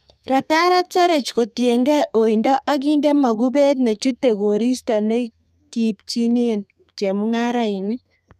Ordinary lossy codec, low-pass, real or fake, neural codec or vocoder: none; 14.4 kHz; fake; codec, 32 kHz, 1.9 kbps, SNAC